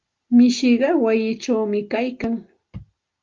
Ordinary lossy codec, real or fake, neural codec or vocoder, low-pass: Opus, 32 kbps; real; none; 7.2 kHz